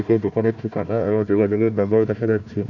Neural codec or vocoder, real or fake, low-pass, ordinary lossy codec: codec, 16 kHz, 1 kbps, FunCodec, trained on Chinese and English, 50 frames a second; fake; 7.2 kHz; none